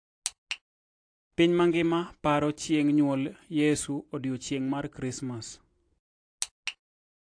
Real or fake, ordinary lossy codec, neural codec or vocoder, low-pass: real; AAC, 48 kbps; none; 9.9 kHz